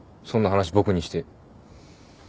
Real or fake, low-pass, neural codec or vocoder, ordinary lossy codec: real; none; none; none